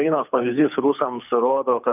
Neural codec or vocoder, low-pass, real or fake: codec, 24 kHz, 6 kbps, HILCodec; 3.6 kHz; fake